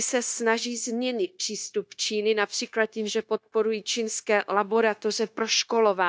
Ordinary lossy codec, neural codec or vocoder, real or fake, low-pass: none; codec, 16 kHz, 0.9 kbps, LongCat-Audio-Codec; fake; none